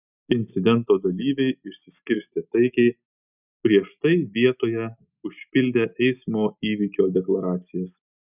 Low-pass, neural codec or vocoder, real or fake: 3.6 kHz; none; real